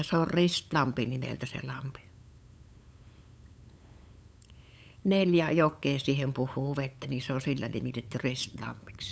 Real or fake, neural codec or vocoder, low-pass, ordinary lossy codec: fake; codec, 16 kHz, 8 kbps, FunCodec, trained on LibriTTS, 25 frames a second; none; none